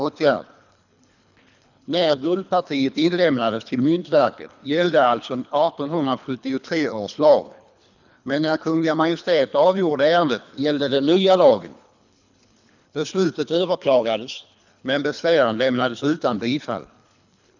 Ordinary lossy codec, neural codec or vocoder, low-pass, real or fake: none; codec, 24 kHz, 3 kbps, HILCodec; 7.2 kHz; fake